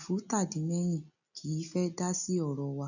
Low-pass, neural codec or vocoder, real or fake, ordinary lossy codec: 7.2 kHz; none; real; none